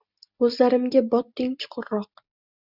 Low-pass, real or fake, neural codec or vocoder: 5.4 kHz; real; none